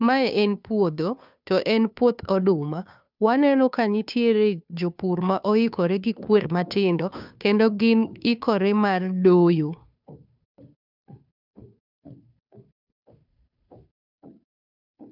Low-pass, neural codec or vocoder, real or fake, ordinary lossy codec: 5.4 kHz; codec, 16 kHz, 2 kbps, FunCodec, trained on Chinese and English, 25 frames a second; fake; none